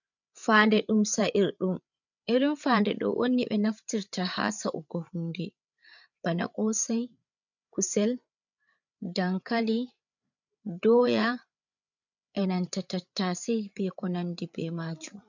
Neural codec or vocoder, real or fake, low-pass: codec, 16 kHz, 8 kbps, FreqCodec, larger model; fake; 7.2 kHz